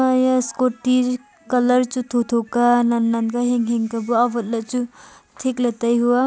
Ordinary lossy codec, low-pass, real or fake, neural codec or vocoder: none; none; real; none